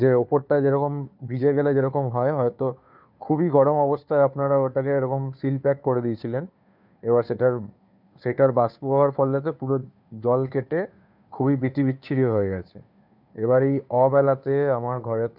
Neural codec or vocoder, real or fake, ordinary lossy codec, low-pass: codec, 16 kHz, 2 kbps, FunCodec, trained on Chinese and English, 25 frames a second; fake; none; 5.4 kHz